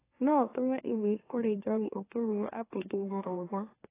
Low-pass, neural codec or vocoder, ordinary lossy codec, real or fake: 3.6 kHz; autoencoder, 44.1 kHz, a latent of 192 numbers a frame, MeloTTS; AAC, 16 kbps; fake